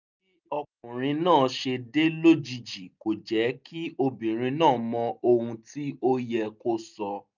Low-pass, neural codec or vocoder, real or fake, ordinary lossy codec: 7.2 kHz; none; real; none